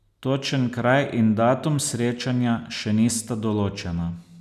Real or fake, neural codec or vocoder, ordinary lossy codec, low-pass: real; none; none; 14.4 kHz